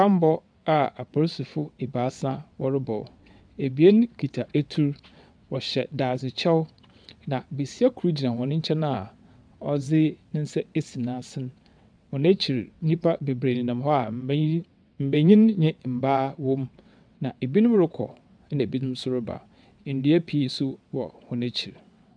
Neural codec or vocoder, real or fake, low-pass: vocoder, 22.05 kHz, 80 mel bands, WaveNeXt; fake; 9.9 kHz